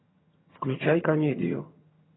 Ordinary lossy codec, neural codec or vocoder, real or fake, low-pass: AAC, 16 kbps; vocoder, 22.05 kHz, 80 mel bands, HiFi-GAN; fake; 7.2 kHz